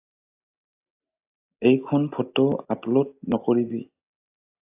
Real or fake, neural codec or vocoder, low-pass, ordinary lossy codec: real; none; 3.6 kHz; AAC, 24 kbps